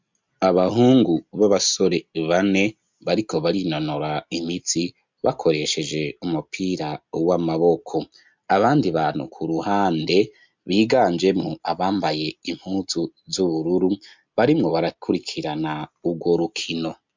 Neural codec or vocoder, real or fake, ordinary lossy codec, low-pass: none; real; MP3, 64 kbps; 7.2 kHz